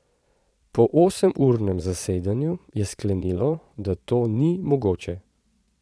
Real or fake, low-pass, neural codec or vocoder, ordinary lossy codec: fake; none; vocoder, 22.05 kHz, 80 mel bands, Vocos; none